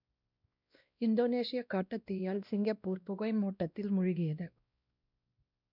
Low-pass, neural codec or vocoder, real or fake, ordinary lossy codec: 5.4 kHz; codec, 16 kHz, 1 kbps, X-Codec, WavLM features, trained on Multilingual LibriSpeech; fake; none